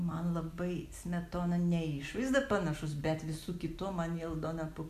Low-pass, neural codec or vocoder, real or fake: 14.4 kHz; none; real